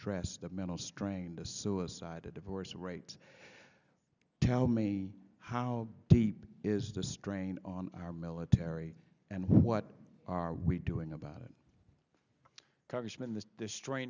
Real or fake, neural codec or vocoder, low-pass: real; none; 7.2 kHz